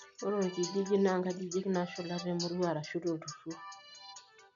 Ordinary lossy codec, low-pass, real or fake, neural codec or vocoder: none; 7.2 kHz; real; none